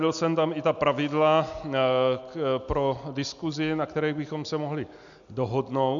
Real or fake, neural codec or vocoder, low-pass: real; none; 7.2 kHz